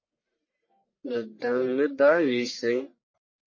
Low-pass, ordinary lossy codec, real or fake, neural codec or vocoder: 7.2 kHz; MP3, 32 kbps; fake; codec, 44.1 kHz, 1.7 kbps, Pupu-Codec